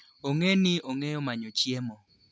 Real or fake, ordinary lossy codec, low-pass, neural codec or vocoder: real; none; none; none